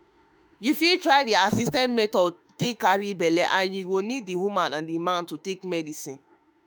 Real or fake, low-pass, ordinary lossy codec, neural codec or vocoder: fake; none; none; autoencoder, 48 kHz, 32 numbers a frame, DAC-VAE, trained on Japanese speech